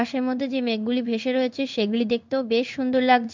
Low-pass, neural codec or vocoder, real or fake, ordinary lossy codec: 7.2 kHz; codec, 16 kHz in and 24 kHz out, 1 kbps, XY-Tokenizer; fake; MP3, 64 kbps